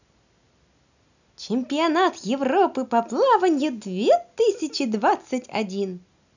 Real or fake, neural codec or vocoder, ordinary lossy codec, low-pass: real; none; none; 7.2 kHz